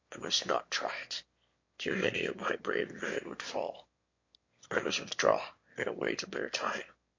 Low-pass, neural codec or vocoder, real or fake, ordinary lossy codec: 7.2 kHz; autoencoder, 22.05 kHz, a latent of 192 numbers a frame, VITS, trained on one speaker; fake; MP3, 48 kbps